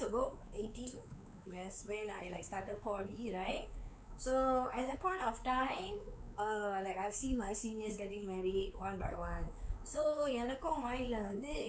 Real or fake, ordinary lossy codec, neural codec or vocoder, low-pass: fake; none; codec, 16 kHz, 4 kbps, X-Codec, WavLM features, trained on Multilingual LibriSpeech; none